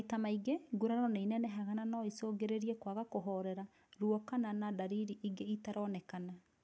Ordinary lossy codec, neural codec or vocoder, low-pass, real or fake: none; none; none; real